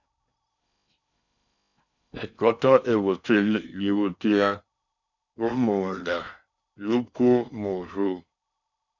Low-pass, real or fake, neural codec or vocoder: 7.2 kHz; fake; codec, 16 kHz in and 24 kHz out, 0.6 kbps, FocalCodec, streaming, 4096 codes